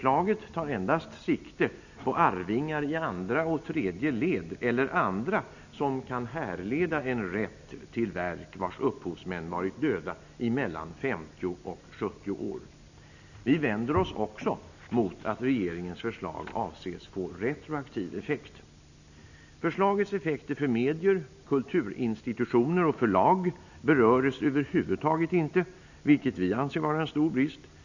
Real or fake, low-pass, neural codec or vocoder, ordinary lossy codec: real; 7.2 kHz; none; none